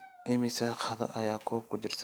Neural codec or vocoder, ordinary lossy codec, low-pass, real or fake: codec, 44.1 kHz, 7.8 kbps, DAC; none; none; fake